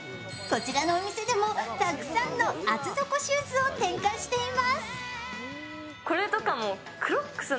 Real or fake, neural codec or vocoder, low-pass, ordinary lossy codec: real; none; none; none